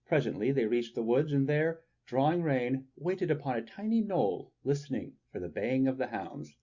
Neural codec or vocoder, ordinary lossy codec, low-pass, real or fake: none; Opus, 64 kbps; 7.2 kHz; real